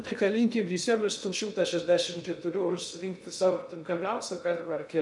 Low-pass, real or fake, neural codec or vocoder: 10.8 kHz; fake; codec, 16 kHz in and 24 kHz out, 0.6 kbps, FocalCodec, streaming, 2048 codes